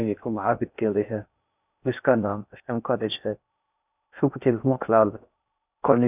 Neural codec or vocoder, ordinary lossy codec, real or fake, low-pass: codec, 16 kHz in and 24 kHz out, 0.6 kbps, FocalCodec, streaming, 4096 codes; none; fake; 3.6 kHz